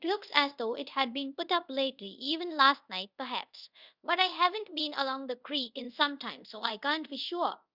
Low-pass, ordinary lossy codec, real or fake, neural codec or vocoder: 5.4 kHz; Opus, 64 kbps; fake; codec, 24 kHz, 0.5 kbps, DualCodec